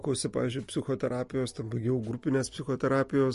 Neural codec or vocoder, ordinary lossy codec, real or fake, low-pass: none; MP3, 48 kbps; real; 14.4 kHz